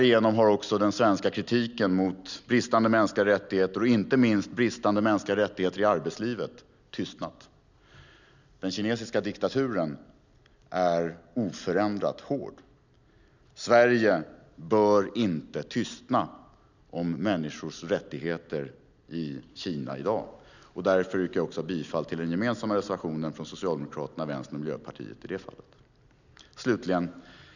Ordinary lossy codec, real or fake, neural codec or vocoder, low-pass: none; real; none; 7.2 kHz